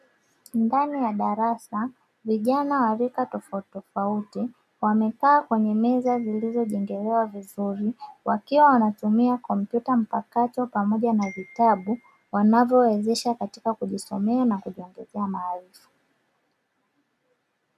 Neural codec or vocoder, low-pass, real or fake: none; 14.4 kHz; real